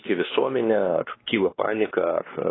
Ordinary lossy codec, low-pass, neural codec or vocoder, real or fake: AAC, 16 kbps; 7.2 kHz; codec, 16 kHz, 2 kbps, X-Codec, WavLM features, trained on Multilingual LibriSpeech; fake